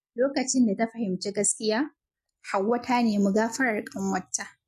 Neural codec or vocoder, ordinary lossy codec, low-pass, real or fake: none; MP3, 64 kbps; 14.4 kHz; real